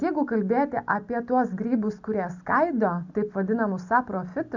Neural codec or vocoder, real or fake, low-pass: none; real; 7.2 kHz